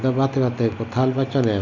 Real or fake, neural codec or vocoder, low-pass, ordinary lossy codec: real; none; 7.2 kHz; none